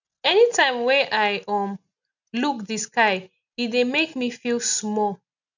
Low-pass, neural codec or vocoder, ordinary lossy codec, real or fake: 7.2 kHz; none; none; real